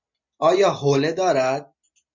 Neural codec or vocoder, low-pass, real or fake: none; 7.2 kHz; real